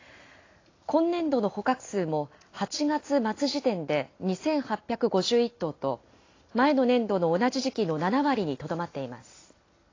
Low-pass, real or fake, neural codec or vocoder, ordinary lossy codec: 7.2 kHz; real; none; AAC, 32 kbps